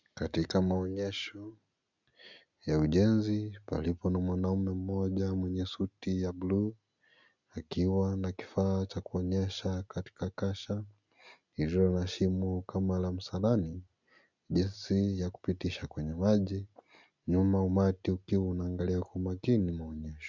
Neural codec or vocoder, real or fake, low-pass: none; real; 7.2 kHz